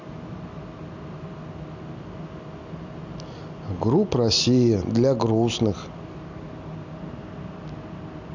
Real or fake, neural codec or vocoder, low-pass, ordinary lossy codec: real; none; 7.2 kHz; none